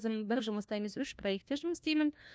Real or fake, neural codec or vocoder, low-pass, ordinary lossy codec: fake; codec, 16 kHz, 1 kbps, FunCodec, trained on LibriTTS, 50 frames a second; none; none